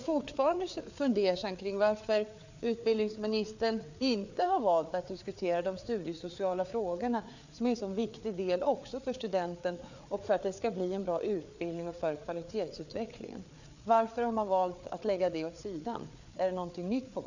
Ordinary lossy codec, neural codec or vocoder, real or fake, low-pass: none; codec, 16 kHz, 4 kbps, FreqCodec, larger model; fake; 7.2 kHz